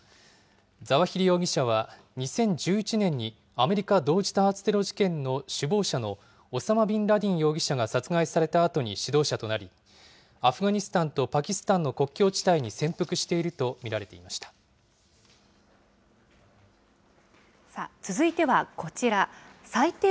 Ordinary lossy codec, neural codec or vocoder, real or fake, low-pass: none; none; real; none